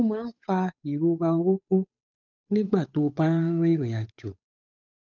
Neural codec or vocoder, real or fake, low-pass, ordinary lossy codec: codec, 16 kHz, 8 kbps, FunCodec, trained on Chinese and English, 25 frames a second; fake; 7.2 kHz; none